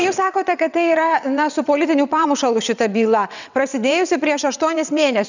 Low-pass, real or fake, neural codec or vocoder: 7.2 kHz; fake; vocoder, 22.05 kHz, 80 mel bands, WaveNeXt